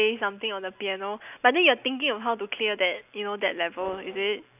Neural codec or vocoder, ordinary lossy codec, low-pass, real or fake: none; none; 3.6 kHz; real